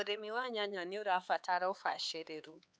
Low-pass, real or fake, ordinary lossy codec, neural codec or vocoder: none; fake; none; codec, 16 kHz, 4 kbps, X-Codec, HuBERT features, trained on LibriSpeech